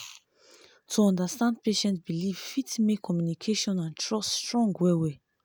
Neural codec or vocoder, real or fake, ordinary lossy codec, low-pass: none; real; none; none